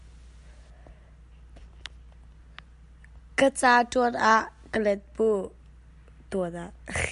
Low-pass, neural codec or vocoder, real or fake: 10.8 kHz; none; real